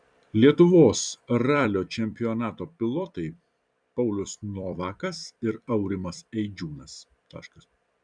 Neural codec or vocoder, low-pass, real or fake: vocoder, 44.1 kHz, 128 mel bands every 256 samples, BigVGAN v2; 9.9 kHz; fake